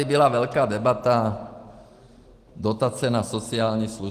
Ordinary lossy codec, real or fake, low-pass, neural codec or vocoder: Opus, 24 kbps; real; 14.4 kHz; none